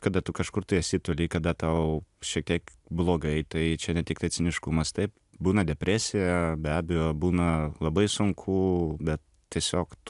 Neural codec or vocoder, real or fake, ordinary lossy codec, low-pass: none; real; AAC, 96 kbps; 10.8 kHz